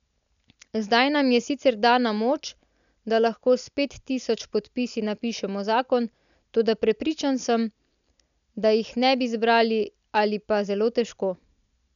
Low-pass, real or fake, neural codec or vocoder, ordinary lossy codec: 7.2 kHz; real; none; none